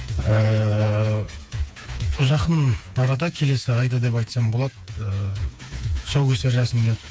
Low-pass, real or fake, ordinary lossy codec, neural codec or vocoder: none; fake; none; codec, 16 kHz, 4 kbps, FreqCodec, smaller model